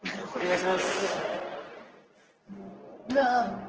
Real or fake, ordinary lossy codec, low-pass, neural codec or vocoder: fake; Opus, 16 kbps; 7.2 kHz; codec, 24 kHz, 0.9 kbps, WavTokenizer, medium speech release version 2